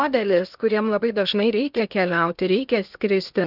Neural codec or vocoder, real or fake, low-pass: codec, 16 kHz in and 24 kHz out, 0.8 kbps, FocalCodec, streaming, 65536 codes; fake; 5.4 kHz